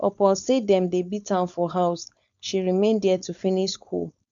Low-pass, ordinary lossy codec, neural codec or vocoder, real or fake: 7.2 kHz; none; codec, 16 kHz, 4.8 kbps, FACodec; fake